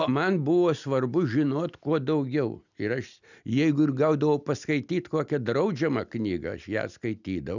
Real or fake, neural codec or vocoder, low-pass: real; none; 7.2 kHz